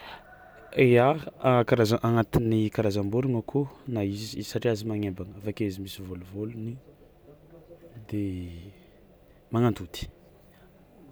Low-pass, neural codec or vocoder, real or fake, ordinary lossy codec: none; none; real; none